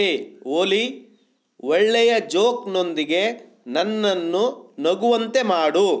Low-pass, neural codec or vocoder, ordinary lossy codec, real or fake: none; none; none; real